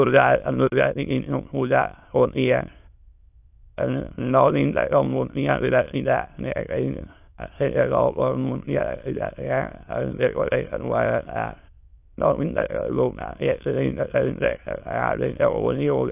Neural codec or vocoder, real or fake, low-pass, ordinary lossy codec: autoencoder, 22.05 kHz, a latent of 192 numbers a frame, VITS, trained on many speakers; fake; 3.6 kHz; AAC, 32 kbps